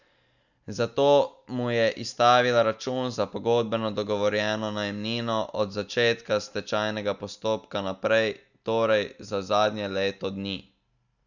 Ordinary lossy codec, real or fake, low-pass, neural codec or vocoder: none; real; 7.2 kHz; none